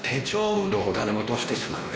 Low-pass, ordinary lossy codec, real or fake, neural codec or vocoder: none; none; fake; codec, 16 kHz, 1 kbps, X-Codec, WavLM features, trained on Multilingual LibriSpeech